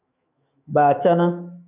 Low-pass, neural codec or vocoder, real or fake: 3.6 kHz; autoencoder, 48 kHz, 128 numbers a frame, DAC-VAE, trained on Japanese speech; fake